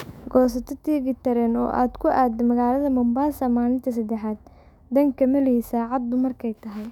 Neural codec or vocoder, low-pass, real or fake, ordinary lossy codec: autoencoder, 48 kHz, 128 numbers a frame, DAC-VAE, trained on Japanese speech; 19.8 kHz; fake; none